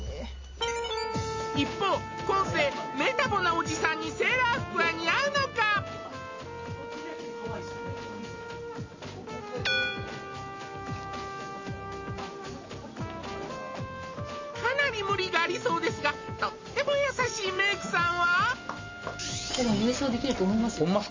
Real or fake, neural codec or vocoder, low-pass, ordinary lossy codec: real; none; 7.2 kHz; MP3, 32 kbps